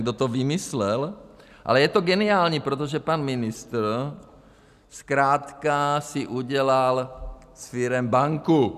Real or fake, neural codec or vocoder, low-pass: real; none; 14.4 kHz